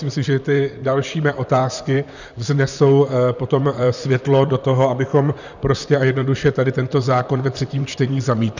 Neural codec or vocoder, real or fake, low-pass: vocoder, 44.1 kHz, 128 mel bands, Pupu-Vocoder; fake; 7.2 kHz